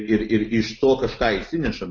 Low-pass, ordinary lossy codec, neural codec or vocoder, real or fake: 7.2 kHz; MP3, 32 kbps; none; real